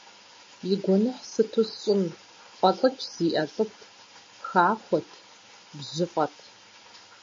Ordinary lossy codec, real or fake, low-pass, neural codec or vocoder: MP3, 32 kbps; real; 7.2 kHz; none